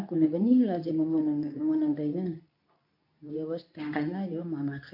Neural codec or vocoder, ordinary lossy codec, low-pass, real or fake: codec, 24 kHz, 0.9 kbps, WavTokenizer, medium speech release version 2; AAC, 32 kbps; 5.4 kHz; fake